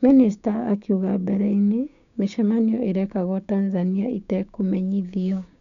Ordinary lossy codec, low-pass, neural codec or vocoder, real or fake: none; 7.2 kHz; codec, 16 kHz, 6 kbps, DAC; fake